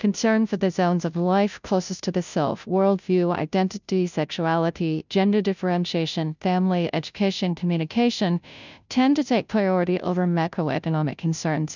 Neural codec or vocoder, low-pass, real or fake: codec, 16 kHz, 0.5 kbps, FunCodec, trained on Chinese and English, 25 frames a second; 7.2 kHz; fake